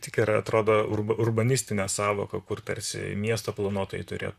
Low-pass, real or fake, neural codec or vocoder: 14.4 kHz; fake; vocoder, 44.1 kHz, 128 mel bands, Pupu-Vocoder